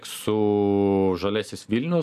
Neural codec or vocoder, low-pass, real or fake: none; 14.4 kHz; real